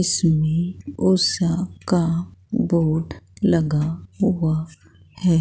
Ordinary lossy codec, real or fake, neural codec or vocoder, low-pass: none; real; none; none